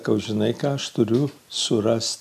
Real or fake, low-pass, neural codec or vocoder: real; 14.4 kHz; none